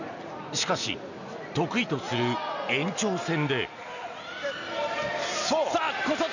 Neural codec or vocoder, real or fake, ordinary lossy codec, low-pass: none; real; none; 7.2 kHz